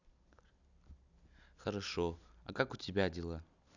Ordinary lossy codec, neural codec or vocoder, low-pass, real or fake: none; none; 7.2 kHz; real